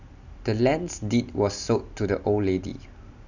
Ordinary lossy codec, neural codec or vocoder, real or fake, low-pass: none; none; real; 7.2 kHz